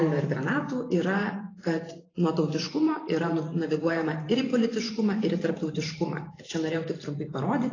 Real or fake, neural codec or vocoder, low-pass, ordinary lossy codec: fake; vocoder, 44.1 kHz, 128 mel bands every 512 samples, BigVGAN v2; 7.2 kHz; AAC, 32 kbps